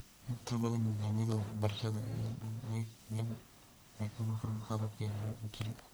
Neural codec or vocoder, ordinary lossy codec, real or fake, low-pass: codec, 44.1 kHz, 1.7 kbps, Pupu-Codec; none; fake; none